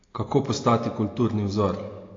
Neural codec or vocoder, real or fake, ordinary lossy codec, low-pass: none; real; AAC, 32 kbps; 7.2 kHz